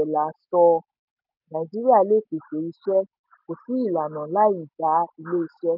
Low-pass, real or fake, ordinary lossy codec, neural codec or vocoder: 5.4 kHz; real; none; none